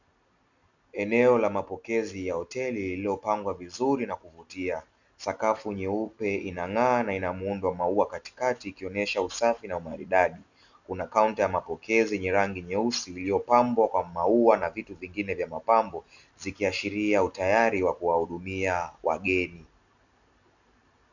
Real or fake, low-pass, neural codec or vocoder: real; 7.2 kHz; none